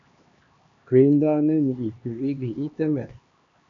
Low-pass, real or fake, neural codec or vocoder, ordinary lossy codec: 7.2 kHz; fake; codec, 16 kHz, 2 kbps, X-Codec, HuBERT features, trained on LibriSpeech; AAC, 48 kbps